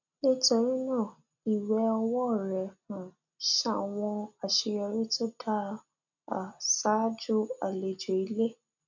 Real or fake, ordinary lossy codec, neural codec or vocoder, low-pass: real; none; none; 7.2 kHz